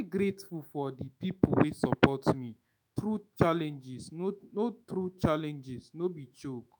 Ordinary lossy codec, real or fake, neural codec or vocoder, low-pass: none; fake; autoencoder, 48 kHz, 128 numbers a frame, DAC-VAE, trained on Japanese speech; none